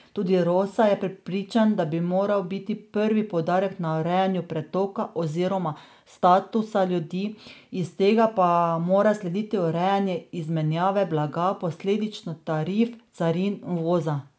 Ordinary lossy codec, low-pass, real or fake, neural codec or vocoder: none; none; real; none